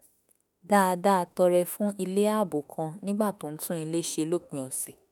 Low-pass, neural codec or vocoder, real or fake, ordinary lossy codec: none; autoencoder, 48 kHz, 32 numbers a frame, DAC-VAE, trained on Japanese speech; fake; none